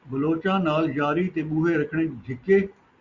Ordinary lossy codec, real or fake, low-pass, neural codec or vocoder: Opus, 64 kbps; real; 7.2 kHz; none